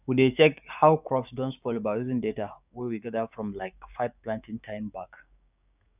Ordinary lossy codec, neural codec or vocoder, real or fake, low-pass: none; codec, 16 kHz, 6 kbps, DAC; fake; 3.6 kHz